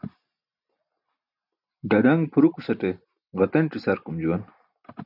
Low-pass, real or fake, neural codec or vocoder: 5.4 kHz; real; none